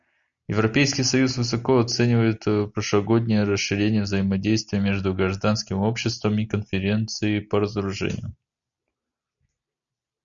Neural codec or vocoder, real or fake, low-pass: none; real; 7.2 kHz